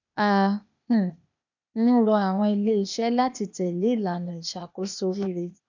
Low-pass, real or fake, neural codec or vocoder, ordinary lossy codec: 7.2 kHz; fake; codec, 16 kHz, 0.8 kbps, ZipCodec; none